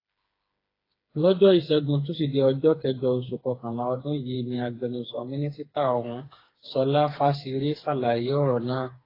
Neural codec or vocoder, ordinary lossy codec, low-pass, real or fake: codec, 16 kHz, 4 kbps, FreqCodec, smaller model; AAC, 24 kbps; 5.4 kHz; fake